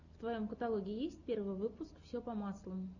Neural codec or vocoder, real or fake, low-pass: none; real; 7.2 kHz